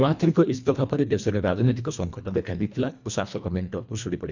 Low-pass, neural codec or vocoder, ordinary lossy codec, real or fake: 7.2 kHz; codec, 24 kHz, 1.5 kbps, HILCodec; none; fake